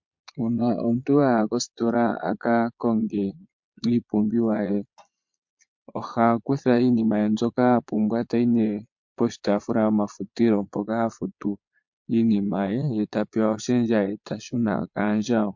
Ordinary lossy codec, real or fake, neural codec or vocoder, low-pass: MP3, 48 kbps; fake; vocoder, 22.05 kHz, 80 mel bands, Vocos; 7.2 kHz